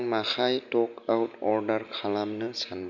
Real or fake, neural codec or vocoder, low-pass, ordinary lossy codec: real; none; 7.2 kHz; none